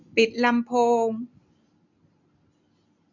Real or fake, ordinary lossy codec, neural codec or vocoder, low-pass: real; none; none; 7.2 kHz